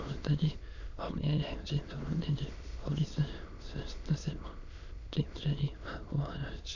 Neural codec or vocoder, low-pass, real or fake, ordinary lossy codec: autoencoder, 22.05 kHz, a latent of 192 numbers a frame, VITS, trained on many speakers; 7.2 kHz; fake; none